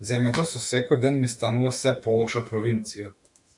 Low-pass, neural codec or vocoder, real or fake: 10.8 kHz; autoencoder, 48 kHz, 32 numbers a frame, DAC-VAE, trained on Japanese speech; fake